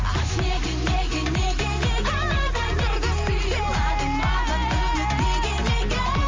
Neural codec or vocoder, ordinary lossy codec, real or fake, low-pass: none; Opus, 32 kbps; real; 7.2 kHz